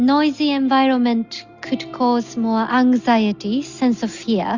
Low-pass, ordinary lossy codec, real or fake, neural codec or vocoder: 7.2 kHz; Opus, 64 kbps; real; none